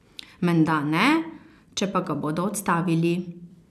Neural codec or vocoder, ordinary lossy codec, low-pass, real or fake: vocoder, 44.1 kHz, 128 mel bands every 256 samples, BigVGAN v2; none; 14.4 kHz; fake